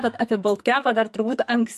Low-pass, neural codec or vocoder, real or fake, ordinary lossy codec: 14.4 kHz; codec, 44.1 kHz, 2.6 kbps, SNAC; fake; MP3, 64 kbps